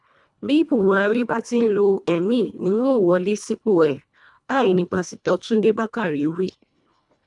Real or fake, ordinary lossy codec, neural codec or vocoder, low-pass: fake; none; codec, 24 kHz, 1.5 kbps, HILCodec; 10.8 kHz